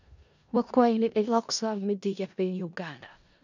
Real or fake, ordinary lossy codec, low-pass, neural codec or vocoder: fake; none; 7.2 kHz; codec, 16 kHz in and 24 kHz out, 0.4 kbps, LongCat-Audio-Codec, four codebook decoder